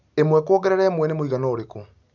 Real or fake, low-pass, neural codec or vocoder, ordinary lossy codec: real; 7.2 kHz; none; none